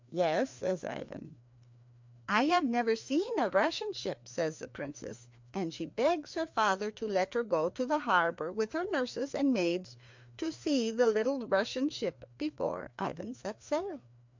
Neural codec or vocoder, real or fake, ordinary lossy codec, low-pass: codec, 16 kHz, 2 kbps, FreqCodec, larger model; fake; MP3, 64 kbps; 7.2 kHz